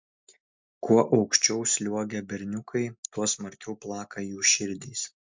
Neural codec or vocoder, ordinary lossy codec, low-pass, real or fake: none; MP3, 64 kbps; 7.2 kHz; real